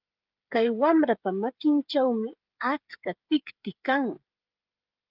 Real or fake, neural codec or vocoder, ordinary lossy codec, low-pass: fake; codec, 16 kHz, 8 kbps, FreqCodec, smaller model; Opus, 24 kbps; 5.4 kHz